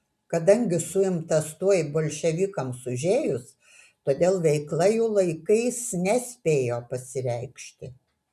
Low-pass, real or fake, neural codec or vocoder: 14.4 kHz; real; none